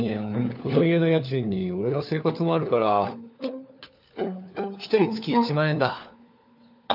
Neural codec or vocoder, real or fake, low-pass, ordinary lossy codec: codec, 16 kHz, 4 kbps, FunCodec, trained on LibriTTS, 50 frames a second; fake; 5.4 kHz; none